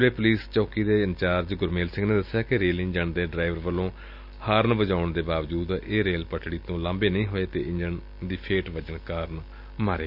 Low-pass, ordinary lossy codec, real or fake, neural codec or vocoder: 5.4 kHz; none; real; none